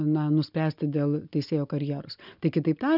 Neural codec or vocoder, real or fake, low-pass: none; real; 5.4 kHz